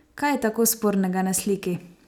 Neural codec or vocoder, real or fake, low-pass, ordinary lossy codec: none; real; none; none